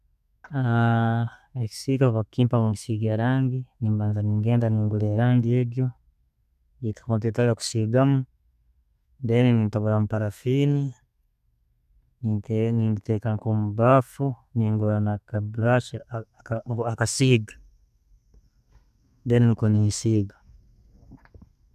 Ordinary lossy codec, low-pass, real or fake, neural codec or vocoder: none; 14.4 kHz; fake; codec, 32 kHz, 1.9 kbps, SNAC